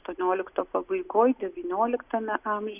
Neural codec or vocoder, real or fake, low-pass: none; real; 3.6 kHz